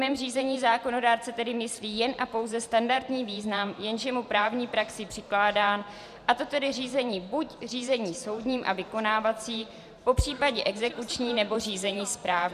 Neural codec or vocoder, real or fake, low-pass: vocoder, 44.1 kHz, 128 mel bands every 512 samples, BigVGAN v2; fake; 14.4 kHz